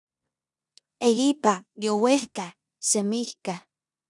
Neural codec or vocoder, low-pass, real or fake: codec, 16 kHz in and 24 kHz out, 0.9 kbps, LongCat-Audio-Codec, four codebook decoder; 10.8 kHz; fake